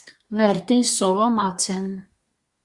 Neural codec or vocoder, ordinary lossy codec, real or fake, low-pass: codec, 24 kHz, 1 kbps, SNAC; Opus, 64 kbps; fake; 10.8 kHz